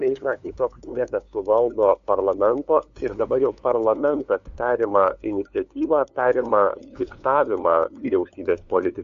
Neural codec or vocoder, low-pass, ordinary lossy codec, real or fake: codec, 16 kHz, 2 kbps, FunCodec, trained on LibriTTS, 25 frames a second; 7.2 kHz; AAC, 64 kbps; fake